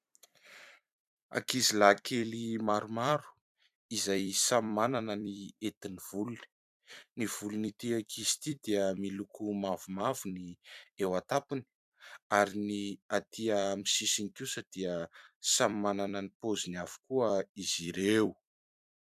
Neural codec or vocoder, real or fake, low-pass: vocoder, 44.1 kHz, 128 mel bands every 256 samples, BigVGAN v2; fake; 14.4 kHz